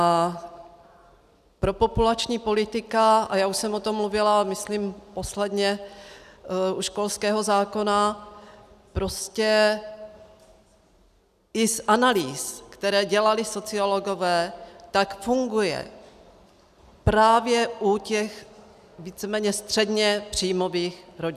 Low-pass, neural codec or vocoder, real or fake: 14.4 kHz; none; real